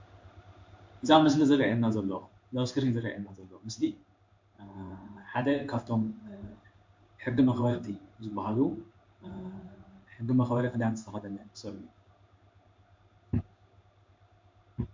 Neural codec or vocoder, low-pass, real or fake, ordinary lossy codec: codec, 16 kHz in and 24 kHz out, 1 kbps, XY-Tokenizer; 7.2 kHz; fake; MP3, 48 kbps